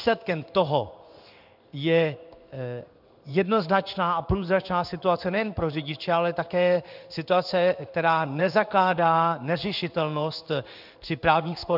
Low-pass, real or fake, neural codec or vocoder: 5.4 kHz; fake; codec, 16 kHz in and 24 kHz out, 1 kbps, XY-Tokenizer